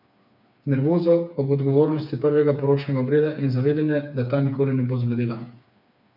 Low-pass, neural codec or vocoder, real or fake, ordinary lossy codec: 5.4 kHz; codec, 16 kHz, 4 kbps, FreqCodec, smaller model; fake; MP3, 48 kbps